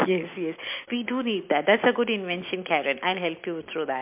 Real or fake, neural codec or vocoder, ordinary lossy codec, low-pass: real; none; MP3, 32 kbps; 3.6 kHz